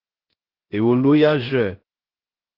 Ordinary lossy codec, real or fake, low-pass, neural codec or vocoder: Opus, 16 kbps; fake; 5.4 kHz; codec, 16 kHz, 0.3 kbps, FocalCodec